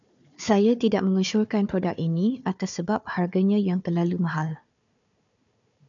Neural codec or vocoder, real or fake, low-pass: codec, 16 kHz, 4 kbps, FunCodec, trained on Chinese and English, 50 frames a second; fake; 7.2 kHz